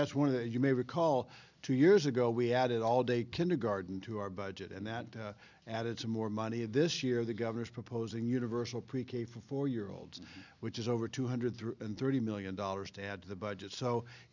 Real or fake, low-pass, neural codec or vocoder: real; 7.2 kHz; none